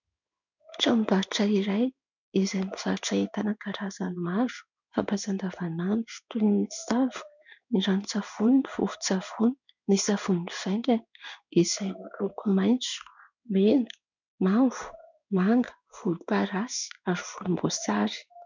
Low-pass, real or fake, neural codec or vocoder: 7.2 kHz; fake; codec, 16 kHz in and 24 kHz out, 1 kbps, XY-Tokenizer